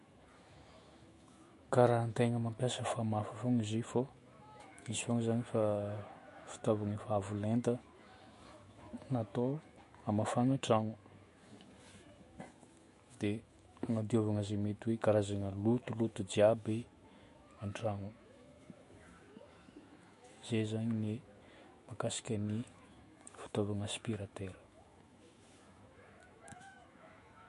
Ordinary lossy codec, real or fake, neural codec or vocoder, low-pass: MP3, 48 kbps; fake; autoencoder, 48 kHz, 128 numbers a frame, DAC-VAE, trained on Japanese speech; 14.4 kHz